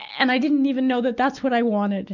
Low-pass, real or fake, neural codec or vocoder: 7.2 kHz; real; none